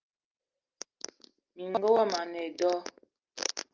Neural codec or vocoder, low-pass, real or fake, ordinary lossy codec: none; 7.2 kHz; real; Opus, 24 kbps